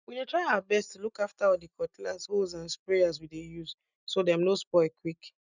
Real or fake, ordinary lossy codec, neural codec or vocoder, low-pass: real; none; none; 7.2 kHz